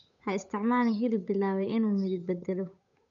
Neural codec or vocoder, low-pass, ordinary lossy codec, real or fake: codec, 16 kHz, 8 kbps, FunCodec, trained on Chinese and English, 25 frames a second; 7.2 kHz; none; fake